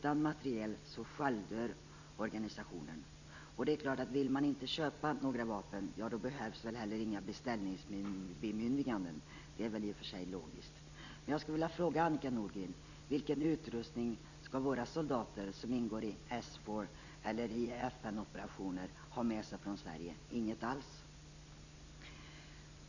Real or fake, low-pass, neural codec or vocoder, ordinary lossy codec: real; 7.2 kHz; none; none